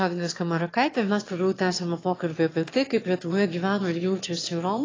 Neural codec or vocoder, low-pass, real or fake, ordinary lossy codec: autoencoder, 22.05 kHz, a latent of 192 numbers a frame, VITS, trained on one speaker; 7.2 kHz; fake; AAC, 32 kbps